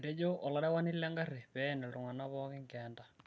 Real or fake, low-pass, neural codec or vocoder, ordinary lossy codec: real; none; none; none